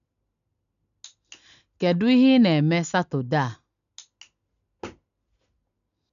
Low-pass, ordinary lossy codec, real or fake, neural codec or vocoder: 7.2 kHz; none; real; none